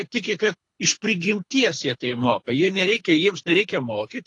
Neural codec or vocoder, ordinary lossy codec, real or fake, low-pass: codec, 24 kHz, 3 kbps, HILCodec; AAC, 48 kbps; fake; 10.8 kHz